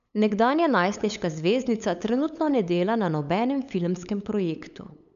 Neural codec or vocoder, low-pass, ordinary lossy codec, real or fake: codec, 16 kHz, 8 kbps, FunCodec, trained on LibriTTS, 25 frames a second; 7.2 kHz; none; fake